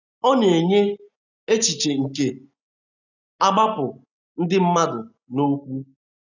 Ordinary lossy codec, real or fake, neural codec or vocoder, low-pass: none; real; none; 7.2 kHz